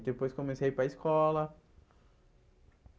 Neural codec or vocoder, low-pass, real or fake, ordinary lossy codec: none; none; real; none